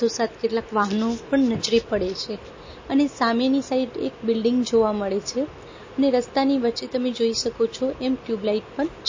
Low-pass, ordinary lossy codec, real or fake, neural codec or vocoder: 7.2 kHz; MP3, 32 kbps; real; none